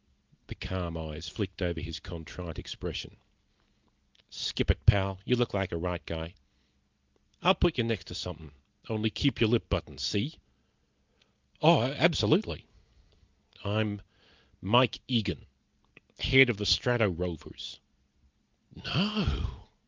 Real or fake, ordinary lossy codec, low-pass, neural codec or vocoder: real; Opus, 32 kbps; 7.2 kHz; none